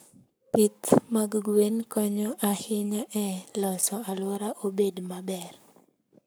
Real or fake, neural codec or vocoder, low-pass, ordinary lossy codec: fake; codec, 44.1 kHz, 7.8 kbps, Pupu-Codec; none; none